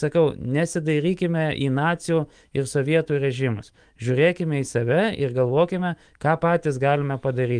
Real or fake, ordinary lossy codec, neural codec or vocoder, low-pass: fake; Opus, 32 kbps; autoencoder, 48 kHz, 128 numbers a frame, DAC-VAE, trained on Japanese speech; 9.9 kHz